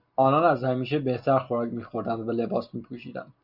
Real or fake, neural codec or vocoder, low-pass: real; none; 5.4 kHz